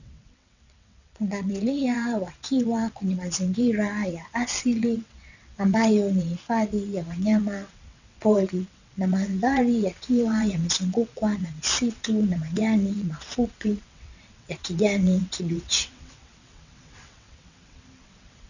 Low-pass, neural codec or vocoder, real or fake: 7.2 kHz; vocoder, 22.05 kHz, 80 mel bands, WaveNeXt; fake